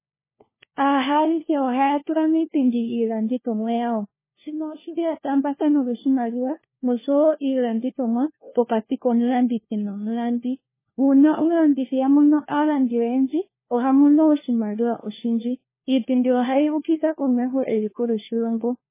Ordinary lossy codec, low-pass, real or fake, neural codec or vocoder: MP3, 16 kbps; 3.6 kHz; fake; codec, 16 kHz, 1 kbps, FunCodec, trained on LibriTTS, 50 frames a second